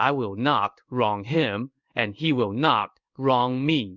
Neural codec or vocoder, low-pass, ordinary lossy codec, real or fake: codec, 16 kHz in and 24 kHz out, 1 kbps, XY-Tokenizer; 7.2 kHz; Opus, 64 kbps; fake